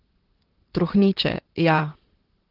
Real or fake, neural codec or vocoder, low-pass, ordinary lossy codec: fake; vocoder, 44.1 kHz, 128 mel bands, Pupu-Vocoder; 5.4 kHz; Opus, 16 kbps